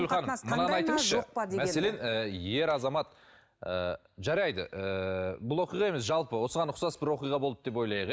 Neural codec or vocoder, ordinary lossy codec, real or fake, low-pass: none; none; real; none